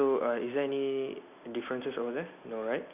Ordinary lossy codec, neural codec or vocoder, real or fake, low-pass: none; none; real; 3.6 kHz